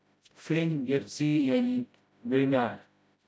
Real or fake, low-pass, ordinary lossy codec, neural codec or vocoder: fake; none; none; codec, 16 kHz, 0.5 kbps, FreqCodec, smaller model